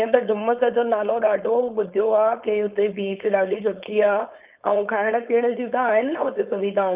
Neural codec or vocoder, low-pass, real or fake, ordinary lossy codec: codec, 16 kHz, 4.8 kbps, FACodec; 3.6 kHz; fake; Opus, 24 kbps